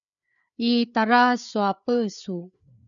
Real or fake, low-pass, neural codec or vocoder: fake; 7.2 kHz; codec, 16 kHz, 4 kbps, FreqCodec, larger model